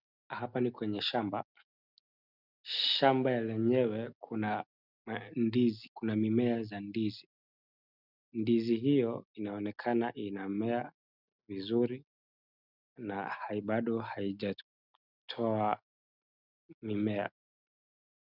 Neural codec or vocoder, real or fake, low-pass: none; real; 5.4 kHz